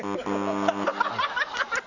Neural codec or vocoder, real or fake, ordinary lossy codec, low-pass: none; real; none; 7.2 kHz